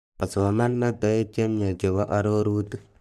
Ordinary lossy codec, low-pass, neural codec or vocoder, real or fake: none; 14.4 kHz; codec, 44.1 kHz, 3.4 kbps, Pupu-Codec; fake